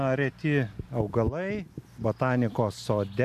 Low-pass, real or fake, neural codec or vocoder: 14.4 kHz; real; none